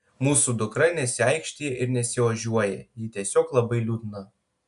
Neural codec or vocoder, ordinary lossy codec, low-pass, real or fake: none; MP3, 96 kbps; 10.8 kHz; real